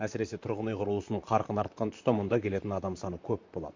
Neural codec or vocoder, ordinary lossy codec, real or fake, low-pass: vocoder, 44.1 kHz, 128 mel bands, Pupu-Vocoder; MP3, 48 kbps; fake; 7.2 kHz